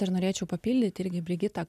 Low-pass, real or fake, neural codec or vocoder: 14.4 kHz; real; none